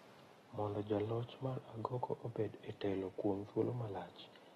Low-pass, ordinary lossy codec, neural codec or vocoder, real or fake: 19.8 kHz; AAC, 32 kbps; none; real